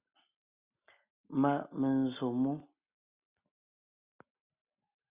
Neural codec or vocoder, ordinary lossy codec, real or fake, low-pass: none; Opus, 64 kbps; real; 3.6 kHz